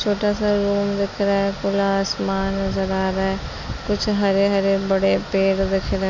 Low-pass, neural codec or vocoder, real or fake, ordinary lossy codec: 7.2 kHz; none; real; MP3, 64 kbps